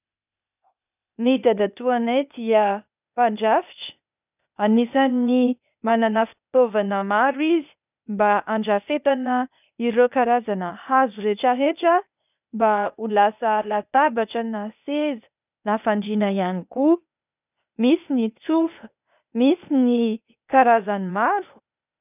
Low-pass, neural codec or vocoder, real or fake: 3.6 kHz; codec, 16 kHz, 0.8 kbps, ZipCodec; fake